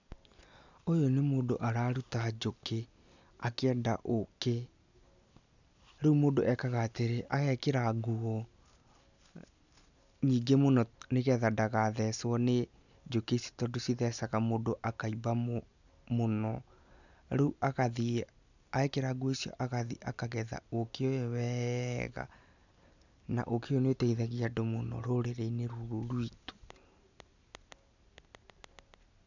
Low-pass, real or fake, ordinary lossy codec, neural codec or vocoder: 7.2 kHz; real; none; none